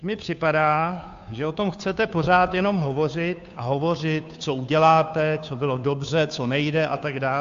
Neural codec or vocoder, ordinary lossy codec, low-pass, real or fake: codec, 16 kHz, 2 kbps, FunCodec, trained on Chinese and English, 25 frames a second; AAC, 64 kbps; 7.2 kHz; fake